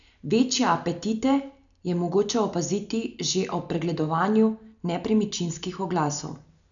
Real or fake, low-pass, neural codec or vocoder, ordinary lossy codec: real; 7.2 kHz; none; none